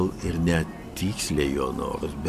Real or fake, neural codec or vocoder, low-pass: real; none; 14.4 kHz